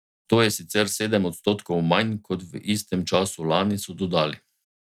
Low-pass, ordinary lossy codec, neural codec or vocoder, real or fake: 19.8 kHz; none; none; real